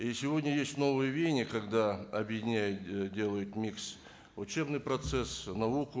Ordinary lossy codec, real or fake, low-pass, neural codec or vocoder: none; real; none; none